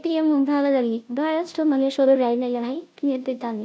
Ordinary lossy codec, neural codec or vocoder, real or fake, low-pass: none; codec, 16 kHz, 0.5 kbps, FunCodec, trained on Chinese and English, 25 frames a second; fake; none